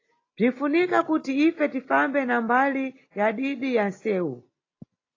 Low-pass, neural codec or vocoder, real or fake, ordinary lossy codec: 7.2 kHz; none; real; AAC, 32 kbps